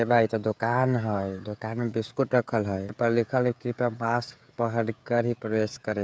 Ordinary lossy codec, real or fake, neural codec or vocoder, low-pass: none; fake; codec, 16 kHz, 16 kbps, FreqCodec, smaller model; none